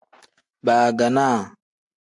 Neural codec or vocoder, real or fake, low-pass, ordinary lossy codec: none; real; 10.8 kHz; AAC, 64 kbps